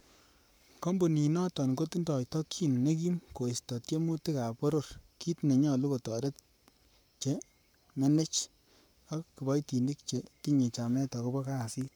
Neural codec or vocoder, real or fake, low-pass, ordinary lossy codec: codec, 44.1 kHz, 7.8 kbps, Pupu-Codec; fake; none; none